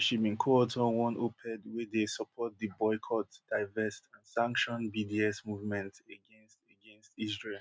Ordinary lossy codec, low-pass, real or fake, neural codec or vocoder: none; none; real; none